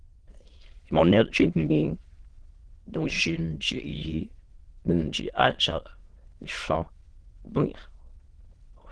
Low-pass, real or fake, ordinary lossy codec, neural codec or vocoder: 9.9 kHz; fake; Opus, 16 kbps; autoencoder, 22.05 kHz, a latent of 192 numbers a frame, VITS, trained on many speakers